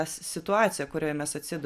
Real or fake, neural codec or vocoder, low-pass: real; none; 14.4 kHz